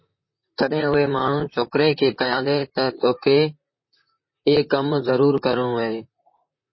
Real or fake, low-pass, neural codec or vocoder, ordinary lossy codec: fake; 7.2 kHz; vocoder, 44.1 kHz, 128 mel bands, Pupu-Vocoder; MP3, 24 kbps